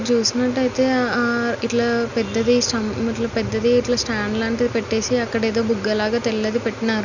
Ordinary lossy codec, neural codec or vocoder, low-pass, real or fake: none; none; 7.2 kHz; real